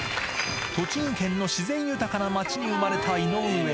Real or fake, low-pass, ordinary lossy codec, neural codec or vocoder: real; none; none; none